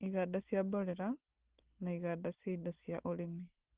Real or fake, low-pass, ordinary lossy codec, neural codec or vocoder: real; 3.6 kHz; Opus, 16 kbps; none